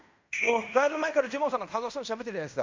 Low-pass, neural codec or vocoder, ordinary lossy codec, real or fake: 7.2 kHz; codec, 16 kHz in and 24 kHz out, 0.9 kbps, LongCat-Audio-Codec, fine tuned four codebook decoder; MP3, 64 kbps; fake